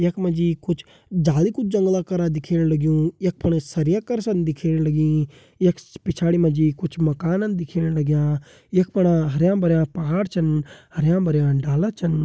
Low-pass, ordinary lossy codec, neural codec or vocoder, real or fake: none; none; none; real